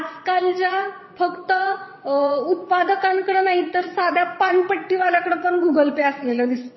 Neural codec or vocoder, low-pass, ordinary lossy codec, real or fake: vocoder, 22.05 kHz, 80 mel bands, WaveNeXt; 7.2 kHz; MP3, 24 kbps; fake